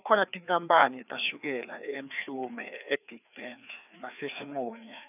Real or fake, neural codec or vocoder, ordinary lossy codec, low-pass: fake; codec, 16 kHz, 2 kbps, FreqCodec, larger model; none; 3.6 kHz